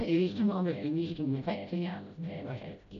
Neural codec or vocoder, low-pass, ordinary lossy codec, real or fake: codec, 16 kHz, 0.5 kbps, FreqCodec, smaller model; 7.2 kHz; none; fake